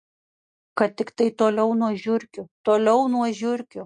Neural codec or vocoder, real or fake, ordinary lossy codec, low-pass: none; real; MP3, 48 kbps; 9.9 kHz